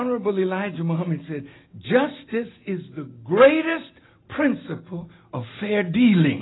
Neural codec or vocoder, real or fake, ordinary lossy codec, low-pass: none; real; AAC, 16 kbps; 7.2 kHz